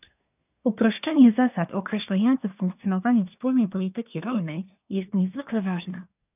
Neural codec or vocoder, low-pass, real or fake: codec, 24 kHz, 1 kbps, SNAC; 3.6 kHz; fake